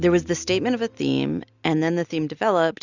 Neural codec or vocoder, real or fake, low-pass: none; real; 7.2 kHz